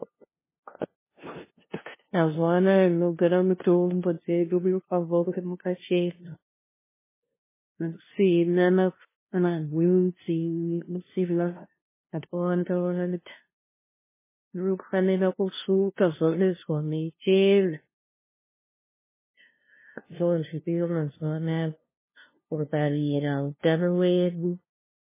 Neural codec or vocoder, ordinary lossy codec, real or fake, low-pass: codec, 16 kHz, 0.5 kbps, FunCodec, trained on LibriTTS, 25 frames a second; MP3, 16 kbps; fake; 3.6 kHz